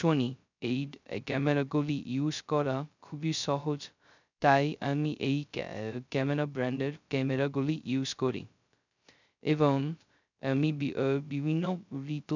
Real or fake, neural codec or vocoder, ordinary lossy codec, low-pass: fake; codec, 16 kHz, 0.2 kbps, FocalCodec; none; 7.2 kHz